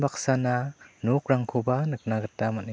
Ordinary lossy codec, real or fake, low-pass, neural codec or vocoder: none; real; none; none